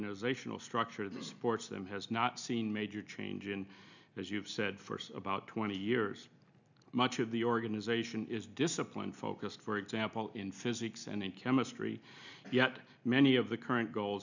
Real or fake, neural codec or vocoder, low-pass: real; none; 7.2 kHz